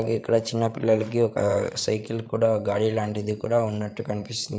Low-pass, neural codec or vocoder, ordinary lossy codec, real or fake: none; codec, 16 kHz, 8 kbps, FreqCodec, larger model; none; fake